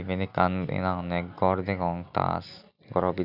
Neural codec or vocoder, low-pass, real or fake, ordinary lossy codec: none; 5.4 kHz; real; none